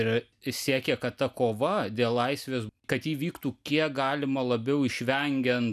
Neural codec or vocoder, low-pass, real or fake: none; 14.4 kHz; real